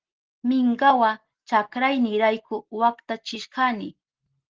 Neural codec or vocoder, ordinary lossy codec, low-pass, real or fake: none; Opus, 16 kbps; 7.2 kHz; real